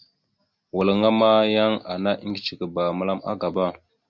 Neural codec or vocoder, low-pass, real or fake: none; 7.2 kHz; real